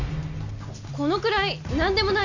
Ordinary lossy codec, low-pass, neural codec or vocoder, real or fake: none; 7.2 kHz; none; real